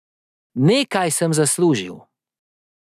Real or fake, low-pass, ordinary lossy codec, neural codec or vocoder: real; 14.4 kHz; none; none